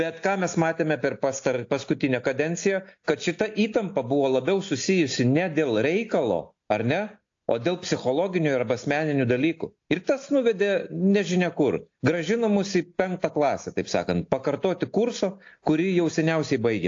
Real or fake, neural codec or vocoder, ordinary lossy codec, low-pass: real; none; AAC, 48 kbps; 7.2 kHz